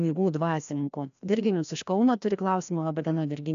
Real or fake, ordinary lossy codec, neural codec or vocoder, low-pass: fake; AAC, 96 kbps; codec, 16 kHz, 1 kbps, FreqCodec, larger model; 7.2 kHz